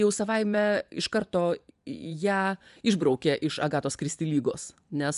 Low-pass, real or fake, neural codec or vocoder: 10.8 kHz; fake; vocoder, 24 kHz, 100 mel bands, Vocos